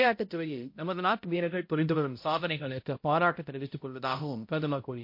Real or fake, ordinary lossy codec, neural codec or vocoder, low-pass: fake; MP3, 32 kbps; codec, 16 kHz, 0.5 kbps, X-Codec, HuBERT features, trained on balanced general audio; 5.4 kHz